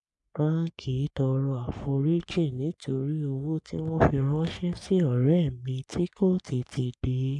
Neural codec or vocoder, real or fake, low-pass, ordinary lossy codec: codec, 44.1 kHz, 3.4 kbps, Pupu-Codec; fake; 10.8 kHz; none